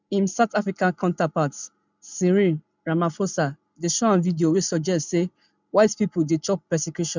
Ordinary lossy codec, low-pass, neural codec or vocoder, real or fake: none; 7.2 kHz; none; real